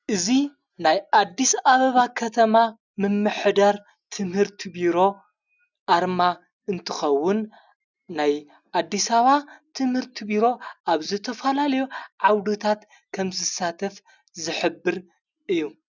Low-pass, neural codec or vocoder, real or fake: 7.2 kHz; none; real